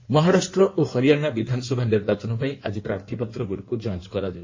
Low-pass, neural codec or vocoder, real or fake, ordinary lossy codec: 7.2 kHz; codec, 16 kHz in and 24 kHz out, 1.1 kbps, FireRedTTS-2 codec; fake; MP3, 32 kbps